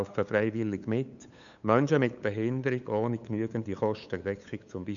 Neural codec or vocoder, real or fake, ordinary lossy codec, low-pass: codec, 16 kHz, 8 kbps, FunCodec, trained on LibriTTS, 25 frames a second; fake; none; 7.2 kHz